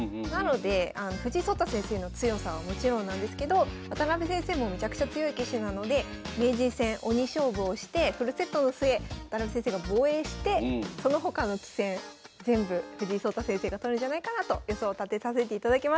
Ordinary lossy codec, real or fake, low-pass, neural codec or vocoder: none; real; none; none